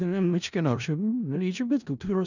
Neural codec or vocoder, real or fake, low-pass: codec, 16 kHz in and 24 kHz out, 0.4 kbps, LongCat-Audio-Codec, four codebook decoder; fake; 7.2 kHz